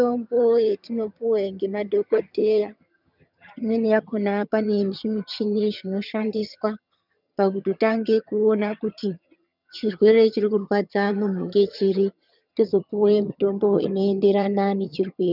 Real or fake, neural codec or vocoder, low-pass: fake; vocoder, 22.05 kHz, 80 mel bands, HiFi-GAN; 5.4 kHz